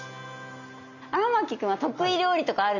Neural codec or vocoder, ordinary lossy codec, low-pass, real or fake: none; none; 7.2 kHz; real